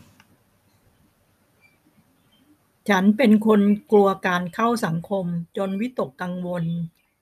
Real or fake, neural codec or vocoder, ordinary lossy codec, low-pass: real; none; none; 14.4 kHz